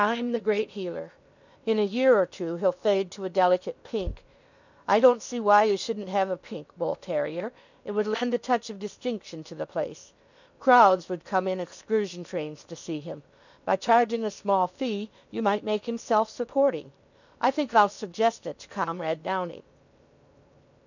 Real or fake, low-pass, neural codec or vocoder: fake; 7.2 kHz; codec, 16 kHz in and 24 kHz out, 0.8 kbps, FocalCodec, streaming, 65536 codes